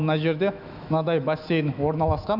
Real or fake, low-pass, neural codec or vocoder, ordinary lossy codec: fake; 5.4 kHz; autoencoder, 48 kHz, 128 numbers a frame, DAC-VAE, trained on Japanese speech; none